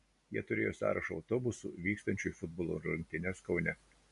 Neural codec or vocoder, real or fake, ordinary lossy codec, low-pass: none; real; MP3, 48 kbps; 10.8 kHz